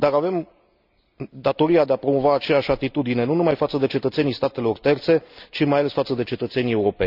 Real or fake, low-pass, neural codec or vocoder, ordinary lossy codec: real; 5.4 kHz; none; none